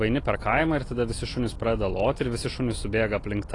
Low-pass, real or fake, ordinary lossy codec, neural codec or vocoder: 10.8 kHz; real; AAC, 32 kbps; none